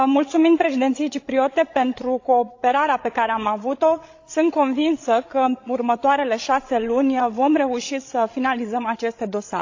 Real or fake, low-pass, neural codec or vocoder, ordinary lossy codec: fake; 7.2 kHz; codec, 16 kHz, 16 kbps, FreqCodec, larger model; AAC, 48 kbps